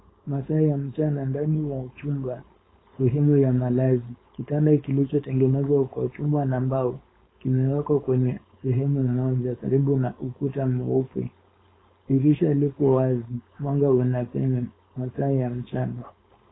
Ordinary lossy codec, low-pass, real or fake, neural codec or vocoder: AAC, 16 kbps; 7.2 kHz; fake; codec, 16 kHz, 4.8 kbps, FACodec